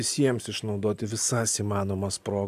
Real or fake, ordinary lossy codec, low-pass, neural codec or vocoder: real; AAC, 96 kbps; 14.4 kHz; none